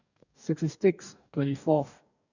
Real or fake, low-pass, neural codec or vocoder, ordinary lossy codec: fake; 7.2 kHz; codec, 44.1 kHz, 2.6 kbps, DAC; none